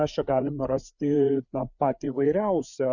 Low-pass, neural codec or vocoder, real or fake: 7.2 kHz; codec, 16 kHz, 4 kbps, FreqCodec, larger model; fake